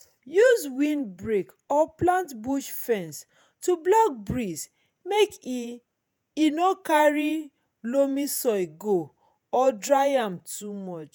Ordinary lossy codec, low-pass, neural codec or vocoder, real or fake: none; none; vocoder, 48 kHz, 128 mel bands, Vocos; fake